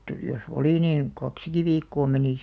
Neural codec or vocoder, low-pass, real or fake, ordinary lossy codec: none; none; real; none